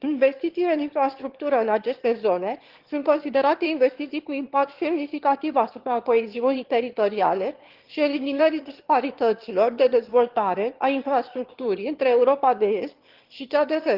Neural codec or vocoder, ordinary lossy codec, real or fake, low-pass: autoencoder, 22.05 kHz, a latent of 192 numbers a frame, VITS, trained on one speaker; Opus, 16 kbps; fake; 5.4 kHz